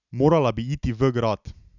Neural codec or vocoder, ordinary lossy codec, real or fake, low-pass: none; none; real; 7.2 kHz